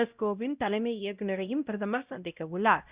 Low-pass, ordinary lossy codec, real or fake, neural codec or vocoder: 3.6 kHz; Opus, 64 kbps; fake; codec, 16 kHz, 0.5 kbps, X-Codec, WavLM features, trained on Multilingual LibriSpeech